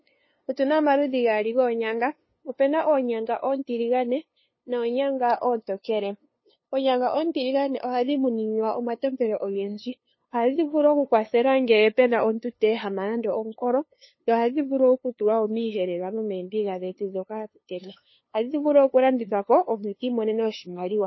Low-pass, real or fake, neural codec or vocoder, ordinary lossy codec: 7.2 kHz; fake; codec, 16 kHz, 2 kbps, FunCodec, trained on LibriTTS, 25 frames a second; MP3, 24 kbps